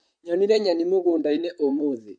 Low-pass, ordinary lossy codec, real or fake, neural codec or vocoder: 9.9 kHz; MP3, 48 kbps; fake; vocoder, 44.1 kHz, 128 mel bands, Pupu-Vocoder